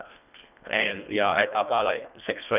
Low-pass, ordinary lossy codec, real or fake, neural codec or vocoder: 3.6 kHz; none; fake; codec, 24 kHz, 1.5 kbps, HILCodec